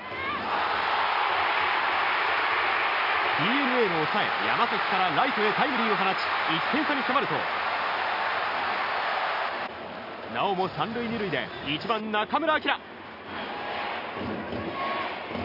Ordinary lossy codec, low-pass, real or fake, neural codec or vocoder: none; 5.4 kHz; real; none